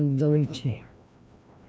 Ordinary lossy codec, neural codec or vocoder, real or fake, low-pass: none; codec, 16 kHz, 1 kbps, FreqCodec, larger model; fake; none